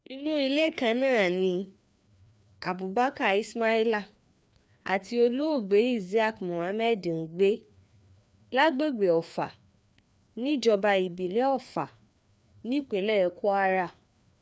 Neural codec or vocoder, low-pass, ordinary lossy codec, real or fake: codec, 16 kHz, 2 kbps, FreqCodec, larger model; none; none; fake